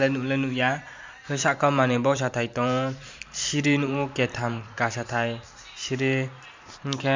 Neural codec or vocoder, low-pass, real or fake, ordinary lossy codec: vocoder, 44.1 kHz, 128 mel bands every 512 samples, BigVGAN v2; 7.2 kHz; fake; MP3, 64 kbps